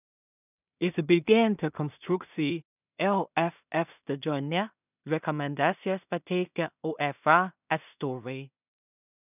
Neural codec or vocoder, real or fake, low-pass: codec, 16 kHz in and 24 kHz out, 0.4 kbps, LongCat-Audio-Codec, two codebook decoder; fake; 3.6 kHz